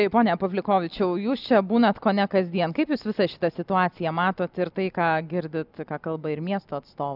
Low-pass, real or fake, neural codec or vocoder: 5.4 kHz; real; none